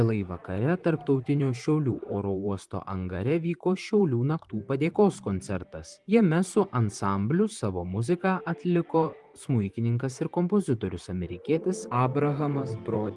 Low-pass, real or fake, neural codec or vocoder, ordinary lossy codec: 10.8 kHz; fake; vocoder, 44.1 kHz, 128 mel bands, Pupu-Vocoder; Opus, 24 kbps